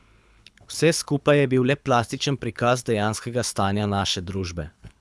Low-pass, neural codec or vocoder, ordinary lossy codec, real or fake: none; codec, 24 kHz, 6 kbps, HILCodec; none; fake